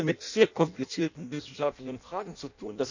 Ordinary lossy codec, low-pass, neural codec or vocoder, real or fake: none; 7.2 kHz; codec, 16 kHz in and 24 kHz out, 0.6 kbps, FireRedTTS-2 codec; fake